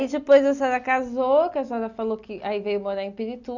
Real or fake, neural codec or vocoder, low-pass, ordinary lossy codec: real; none; 7.2 kHz; none